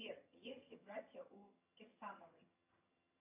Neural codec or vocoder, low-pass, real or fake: vocoder, 22.05 kHz, 80 mel bands, HiFi-GAN; 3.6 kHz; fake